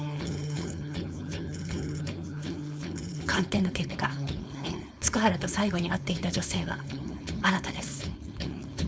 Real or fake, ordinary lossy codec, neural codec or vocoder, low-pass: fake; none; codec, 16 kHz, 4.8 kbps, FACodec; none